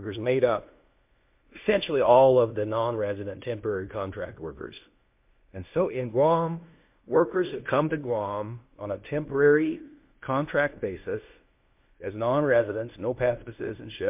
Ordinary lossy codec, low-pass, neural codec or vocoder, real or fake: MP3, 32 kbps; 3.6 kHz; codec, 16 kHz in and 24 kHz out, 0.9 kbps, LongCat-Audio-Codec, fine tuned four codebook decoder; fake